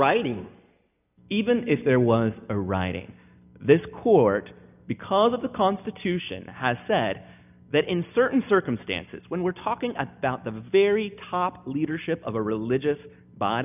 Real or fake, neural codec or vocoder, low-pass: real; none; 3.6 kHz